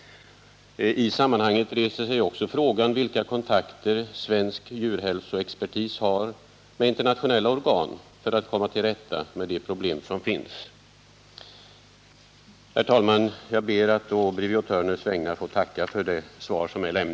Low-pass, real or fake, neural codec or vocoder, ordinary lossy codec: none; real; none; none